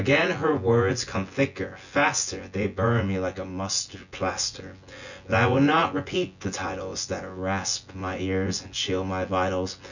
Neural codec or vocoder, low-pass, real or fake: vocoder, 24 kHz, 100 mel bands, Vocos; 7.2 kHz; fake